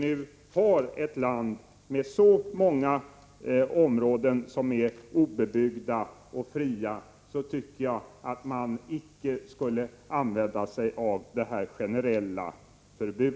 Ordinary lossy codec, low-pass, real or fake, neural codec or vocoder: none; none; real; none